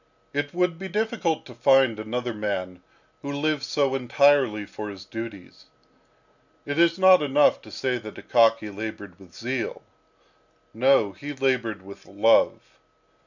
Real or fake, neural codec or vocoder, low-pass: real; none; 7.2 kHz